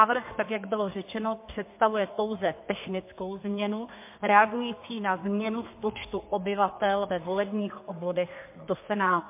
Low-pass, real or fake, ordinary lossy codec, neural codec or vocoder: 3.6 kHz; fake; MP3, 24 kbps; codec, 32 kHz, 1.9 kbps, SNAC